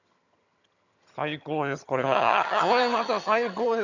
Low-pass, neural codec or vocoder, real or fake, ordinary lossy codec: 7.2 kHz; vocoder, 22.05 kHz, 80 mel bands, HiFi-GAN; fake; none